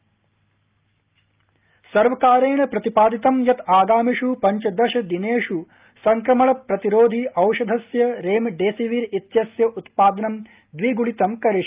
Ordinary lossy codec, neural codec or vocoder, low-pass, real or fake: Opus, 24 kbps; none; 3.6 kHz; real